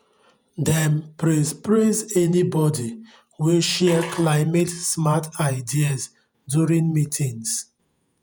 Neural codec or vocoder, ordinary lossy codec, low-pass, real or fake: vocoder, 48 kHz, 128 mel bands, Vocos; none; none; fake